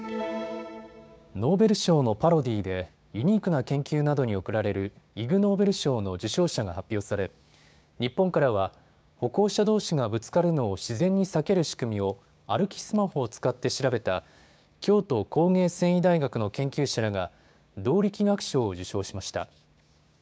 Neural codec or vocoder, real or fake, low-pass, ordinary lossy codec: codec, 16 kHz, 6 kbps, DAC; fake; none; none